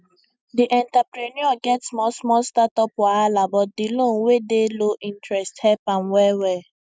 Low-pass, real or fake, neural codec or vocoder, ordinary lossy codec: none; real; none; none